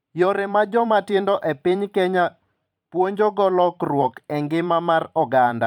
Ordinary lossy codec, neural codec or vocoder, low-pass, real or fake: none; vocoder, 44.1 kHz, 128 mel bands every 512 samples, BigVGAN v2; 19.8 kHz; fake